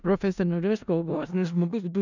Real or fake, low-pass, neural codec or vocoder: fake; 7.2 kHz; codec, 16 kHz in and 24 kHz out, 0.4 kbps, LongCat-Audio-Codec, four codebook decoder